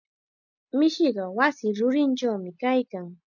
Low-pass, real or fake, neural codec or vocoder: 7.2 kHz; real; none